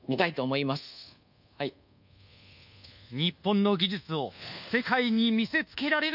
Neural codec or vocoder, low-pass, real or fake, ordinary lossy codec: codec, 24 kHz, 1.2 kbps, DualCodec; 5.4 kHz; fake; none